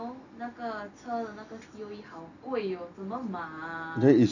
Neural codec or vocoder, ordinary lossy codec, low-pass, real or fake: none; none; 7.2 kHz; real